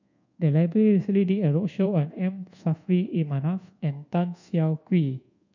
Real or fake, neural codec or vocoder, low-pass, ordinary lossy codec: fake; codec, 24 kHz, 1.2 kbps, DualCodec; 7.2 kHz; none